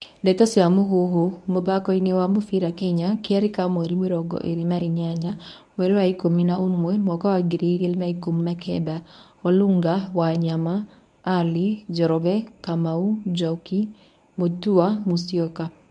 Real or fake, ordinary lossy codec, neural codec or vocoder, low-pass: fake; none; codec, 24 kHz, 0.9 kbps, WavTokenizer, medium speech release version 1; none